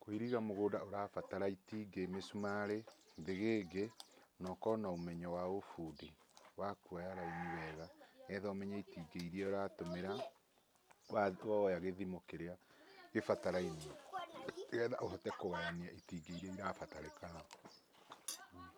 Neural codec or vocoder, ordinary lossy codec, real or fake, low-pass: none; none; real; none